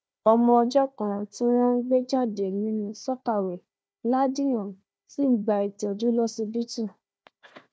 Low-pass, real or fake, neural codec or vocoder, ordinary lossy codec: none; fake; codec, 16 kHz, 1 kbps, FunCodec, trained on Chinese and English, 50 frames a second; none